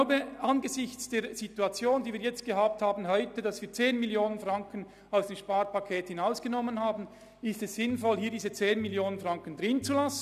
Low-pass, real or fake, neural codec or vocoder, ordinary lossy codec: 14.4 kHz; real; none; none